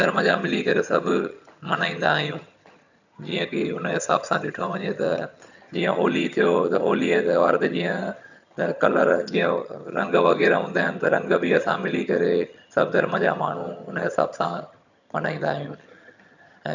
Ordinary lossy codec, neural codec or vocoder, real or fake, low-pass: none; vocoder, 22.05 kHz, 80 mel bands, HiFi-GAN; fake; 7.2 kHz